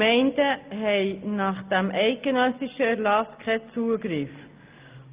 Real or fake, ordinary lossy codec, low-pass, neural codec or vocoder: real; Opus, 24 kbps; 3.6 kHz; none